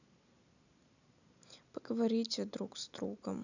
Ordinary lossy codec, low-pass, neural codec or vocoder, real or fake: none; 7.2 kHz; none; real